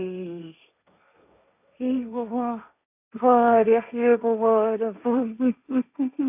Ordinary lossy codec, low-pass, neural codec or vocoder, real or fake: none; 3.6 kHz; codec, 16 kHz, 1.1 kbps, Voila-Tokenizer; fake